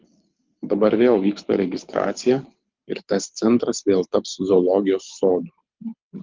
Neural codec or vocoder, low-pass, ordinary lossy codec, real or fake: codec, 24 kHz, 6 kbps, HILCodec; 7.2 kHz; Opus, 32 kbps; fake